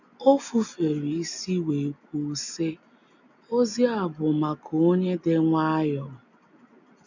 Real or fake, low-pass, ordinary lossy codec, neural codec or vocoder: real; 7.2 kHz; none; none